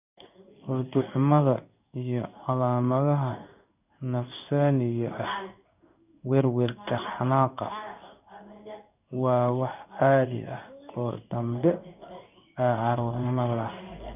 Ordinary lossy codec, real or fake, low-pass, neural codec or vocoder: none; fake; 3.6 kHz; codec, 16 kHz in and 24 kHz out, 1 kbps, XY-Tokenizer